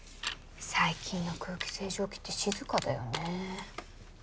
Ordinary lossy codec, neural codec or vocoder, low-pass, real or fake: none; none; none; real